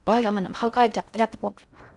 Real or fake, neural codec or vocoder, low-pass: fake; codec, 16 kHz in and 24 kHz out, 0.6 kbps, FocalCodec, streaming, 4096 codes; 10.8 kHz